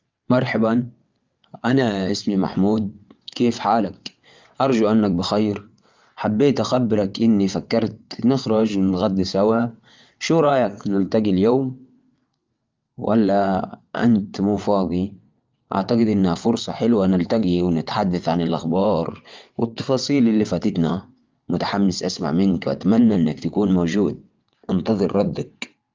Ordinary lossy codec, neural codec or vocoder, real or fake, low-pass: Opus, 24 kbps; vocoder, 22.05 kHz, 80 mel bands, WaveNeXt; fake; 7.2 kHz